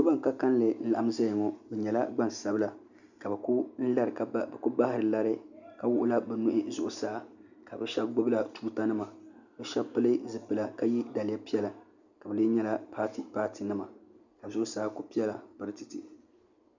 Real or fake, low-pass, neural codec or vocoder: real; 7.2 kHz; none